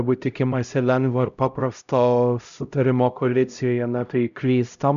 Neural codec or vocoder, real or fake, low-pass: codec, 16 kHz, 0.5 kbps, X-Codec, HuBERT features, trained on LibriSpeech; fake; 7.2 kHz